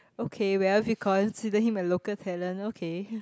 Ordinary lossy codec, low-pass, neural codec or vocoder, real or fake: none; none; none; real